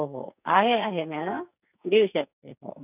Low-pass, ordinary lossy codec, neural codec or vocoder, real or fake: 3.6 kHz; none; codec, 44.1 kHz, 2.6 kbps, SNAC; fake